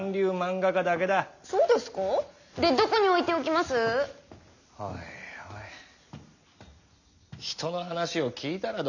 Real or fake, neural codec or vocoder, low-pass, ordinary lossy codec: real; none; 7.2 kHz; none